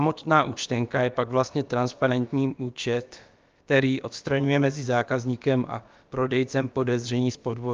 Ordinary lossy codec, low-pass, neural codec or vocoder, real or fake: Opus, 24 kbps; 7.2 kHz; codec, 16 kHz, about 1 kbps, DyCAST, with the encoder's durations; fake